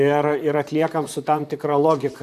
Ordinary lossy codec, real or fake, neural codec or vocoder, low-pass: AAC, 96 kbps; fake; vocoder, 44.1 kHz, 128 mel bands, Pupu-Vocoder; 14.4 kHz